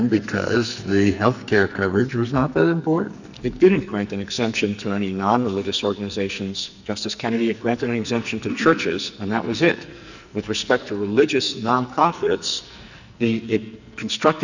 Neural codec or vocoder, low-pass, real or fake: codec, 44.1 kHz, 2.6 kbps, SNAC; 7.2 kHz; fake